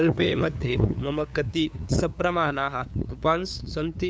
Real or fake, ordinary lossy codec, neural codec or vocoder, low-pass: fake; none; codec, 16 kHz, 2 kbps, FunCodec, trained on LibriTTS, 25 frames a second; none